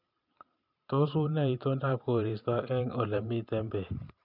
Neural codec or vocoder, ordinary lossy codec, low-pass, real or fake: vocoder, 44.1 kHz, 128 mel bands every 512 samples, BigVGAN v2; none; 5.4 kHz; fake